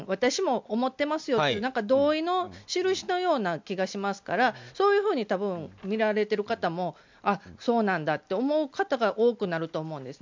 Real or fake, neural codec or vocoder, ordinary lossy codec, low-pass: real; none; MP3, 64 kbps; 7.2 kHz